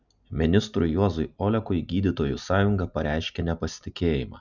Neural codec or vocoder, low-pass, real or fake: none; 7.2 kHz; real